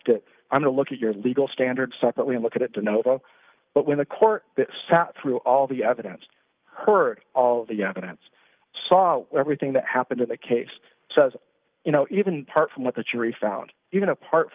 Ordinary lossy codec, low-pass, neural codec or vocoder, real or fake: Opus, 32 kbps; 3.6 kHz; none; real